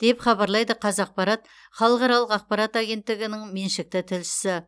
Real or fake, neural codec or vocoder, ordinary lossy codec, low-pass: real; none; none; none